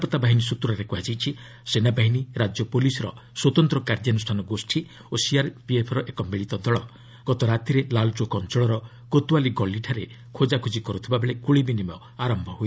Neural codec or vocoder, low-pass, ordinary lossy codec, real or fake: none; none; none; real